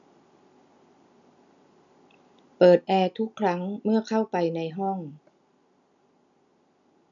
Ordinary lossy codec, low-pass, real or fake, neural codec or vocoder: none; 7.2 kHz; real; none